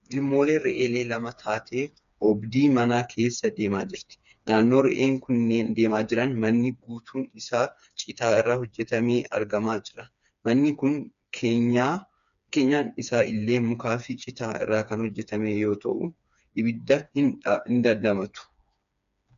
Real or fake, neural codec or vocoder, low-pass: fake; codec, 16 kHz, 4 kbps, FreqCodec, smaller model; 7.2 kHz